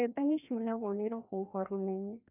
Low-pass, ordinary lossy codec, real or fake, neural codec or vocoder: 3.6 kHz; none; fake; codec, 16 kHz, 1 kbps, FreqCodec, larger model